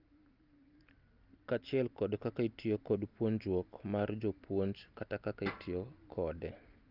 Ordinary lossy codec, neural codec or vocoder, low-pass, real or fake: Opus, 24 kbps; none; 5.4 kHz; real